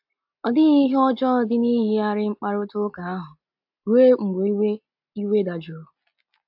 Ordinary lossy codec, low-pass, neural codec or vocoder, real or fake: AAC, 48 kbps; 5.4 kHz; none; real